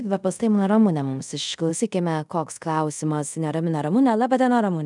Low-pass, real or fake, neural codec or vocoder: 10.8 kHz; fake; codec, 24 kHz, 0.5 kbps, DualCodec